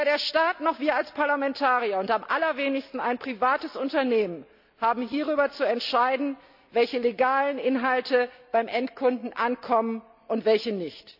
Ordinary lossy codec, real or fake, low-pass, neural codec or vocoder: AAC, 48 kbps; real; 5.4 kHz; none